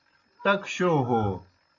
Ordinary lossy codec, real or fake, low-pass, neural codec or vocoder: AAC, 48 kbps; real; 7.2 kHz; none